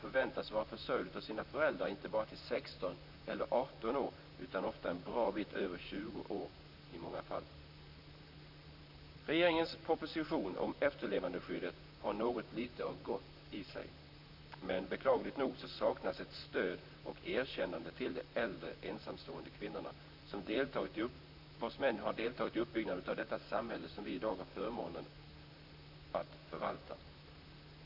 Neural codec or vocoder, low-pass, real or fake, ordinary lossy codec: vocoder, 44.1 kHz, 128 mel bands, Pupu-Vocoder; 5.4 kHz; fake; none